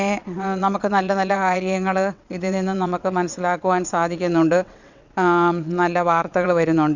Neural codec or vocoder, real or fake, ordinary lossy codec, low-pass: vocoder, 22.05 kHz, 80 mel bands, WaveNeXt; fake; none; 7.2 kHz